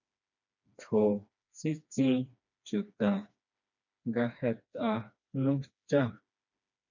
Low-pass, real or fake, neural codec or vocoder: 7.2 kHz; fake; codec, 16 kHz, 2 kbps, FreqCodec, smaller model